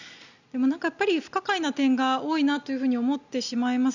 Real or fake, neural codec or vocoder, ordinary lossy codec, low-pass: real; none; none; 7.2 kHz